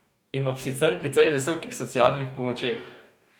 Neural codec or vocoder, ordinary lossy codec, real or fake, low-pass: codec, 44.1 kHz, 2.6 kbps, DAC; none; fake; none